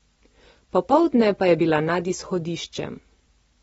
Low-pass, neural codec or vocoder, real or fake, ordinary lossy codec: 19.8 kHz; none; real; AAC, 24 kbps